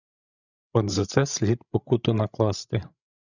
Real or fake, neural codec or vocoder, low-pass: fake; codec, 16 kHz, 16 kbps, FreqCodec, larger model; 7.2 kHz